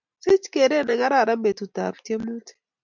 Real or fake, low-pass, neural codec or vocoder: real; 7.2 kHz; none